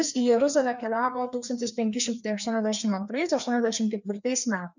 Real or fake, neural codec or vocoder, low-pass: fake; codec, 16 kHz, 2 kbps, FreqCodec, larger model; 7.2 kHz